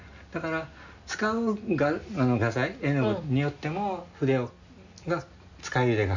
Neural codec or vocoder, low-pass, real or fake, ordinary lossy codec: none; 7.2 kHz; real; none